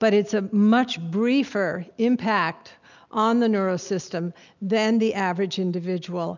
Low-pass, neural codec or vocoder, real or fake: 7.2 kHz; none; real